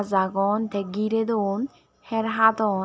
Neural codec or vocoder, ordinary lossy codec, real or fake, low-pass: none; none; real; none